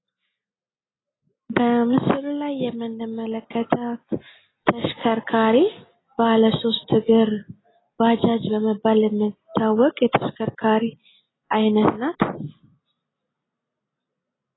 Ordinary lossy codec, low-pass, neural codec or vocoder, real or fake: AAC, 16 kbps; 7.2 kHz; none; real